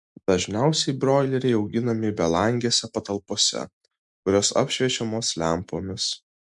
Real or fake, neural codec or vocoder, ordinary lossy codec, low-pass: real; none; MP3, 64 kbps; 10.8 kHz